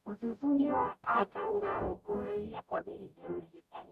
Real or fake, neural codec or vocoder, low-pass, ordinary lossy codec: fake; codec, 44.1 kHz, 0.9 kbps, DAC; 14.4 kHz; none